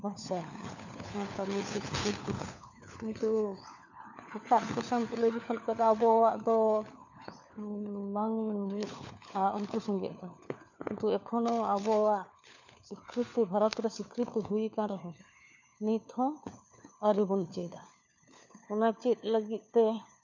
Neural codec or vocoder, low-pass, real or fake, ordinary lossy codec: codec, 16 kHz, 4 kbps, FunCodec, trained on LibriTTS, 50 frames a second; 7.2 kHz; fake; none